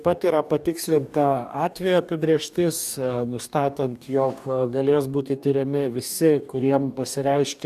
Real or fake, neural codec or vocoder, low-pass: fake; codec, 44.1 kHz, 2.6 kbps, DAC; 14.4 kHz